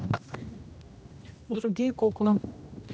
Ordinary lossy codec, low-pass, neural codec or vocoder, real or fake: none; none; codec, 16 kHz, 1 kbps, X-Codec, HuBERT features, trained on general audio; fake